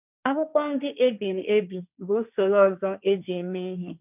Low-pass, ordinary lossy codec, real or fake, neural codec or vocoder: 3.6 kHz; none; fake; codec, 16 kHz, 1.1 kbps, Voila-Tokenizer